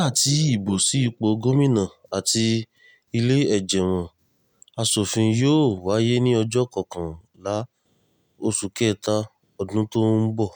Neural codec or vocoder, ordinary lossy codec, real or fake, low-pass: none; none; real; none